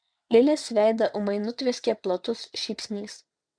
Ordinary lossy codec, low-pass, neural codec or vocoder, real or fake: MP3, 96 kbps; 9.9 kHz; vocoder, 22.05 kHz, 80 mel bands, WaveNeXt; fake